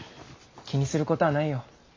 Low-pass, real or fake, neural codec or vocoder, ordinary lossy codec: 7.2 kHz; real; none; MP3, 48 kbps